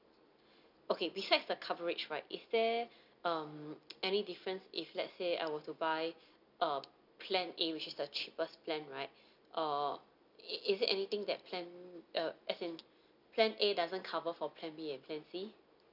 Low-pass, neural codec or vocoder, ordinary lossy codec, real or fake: 5.4 kHz; none; none; real